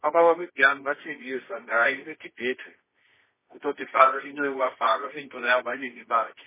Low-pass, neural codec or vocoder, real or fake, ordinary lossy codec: 3.6 kHz; codec, 24 kHz, 0.9 kbps, WavTokenizer, medium music audio release; fake; MP3, 16 kbps